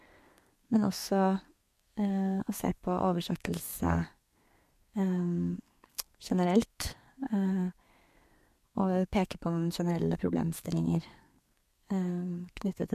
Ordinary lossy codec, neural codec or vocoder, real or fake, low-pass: MP3, 64 kbps; codec, 32 kHz, 1.9 kbps, SNAC; fake; 14.4 kHz